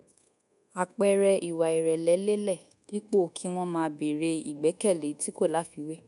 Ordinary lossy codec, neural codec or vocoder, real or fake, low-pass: none; codec, 24 kHz, 1.2 kbps, DualCodec; fake; 10.8 kHz